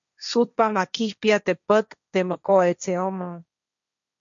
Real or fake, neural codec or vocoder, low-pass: fake; codec, 16 kHz, 1.1 kbps, Voila-Tokenizer; 7.2 kHz